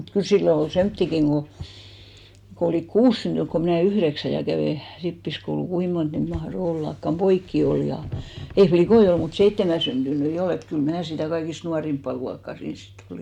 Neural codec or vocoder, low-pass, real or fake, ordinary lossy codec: none; 19.8 kHz; real; MP3, 96 kbps